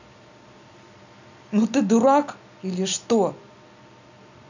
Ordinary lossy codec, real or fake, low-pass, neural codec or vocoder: none; real; 7.2 kHz; none